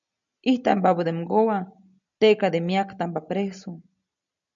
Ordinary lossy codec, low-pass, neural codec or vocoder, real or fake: MP3, 96 kbps; 7.2 kHz; none; real